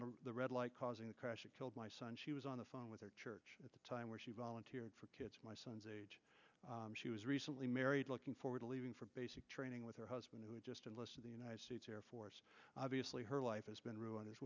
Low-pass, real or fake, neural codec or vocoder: 7.2 kHz; real; none